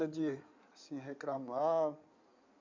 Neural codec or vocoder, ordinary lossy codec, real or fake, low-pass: codec, 16 kHz in and 24 kHz out, 2.2 kbps, FireRedTTS-2 codec; MP3, 48 kbps; fake; 7.2 kHz